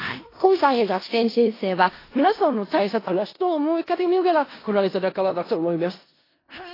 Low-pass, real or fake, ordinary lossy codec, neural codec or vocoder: 5.4 kHz; fake; AAC, 24 kbps; codec, 16 kHz in and 24 kHz out, 0.4 kbps, LongCat-Audio-Codec, four codebook decoder